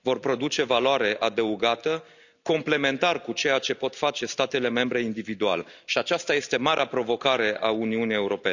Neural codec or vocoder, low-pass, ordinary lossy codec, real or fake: none; 7.2 kHz; none; real